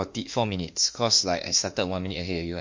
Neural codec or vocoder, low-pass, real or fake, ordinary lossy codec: autoencoder, 48 kHz, 32 numbers a frame, DAC-VAE, trained on Japanese speech; 7.2 kHz; fake; MP3, 48 kbps